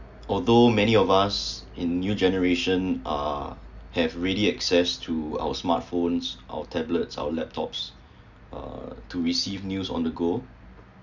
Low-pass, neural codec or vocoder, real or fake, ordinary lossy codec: 7.2 kHz; none; real; none